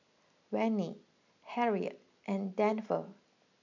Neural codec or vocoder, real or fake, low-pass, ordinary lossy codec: none; real; 7.2 kHz; none